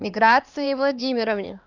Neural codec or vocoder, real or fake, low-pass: codec, 16 kHz, 2 kbps, X-Codec, HuBERT features, trained on LibriSpeech; fake; 7.2 kHz